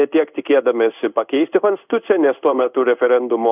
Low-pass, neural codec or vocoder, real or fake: 3.6 kHz; codec, 16 kHz in and 24 kHz out, 1 kbps, XY-Tokenizer; fake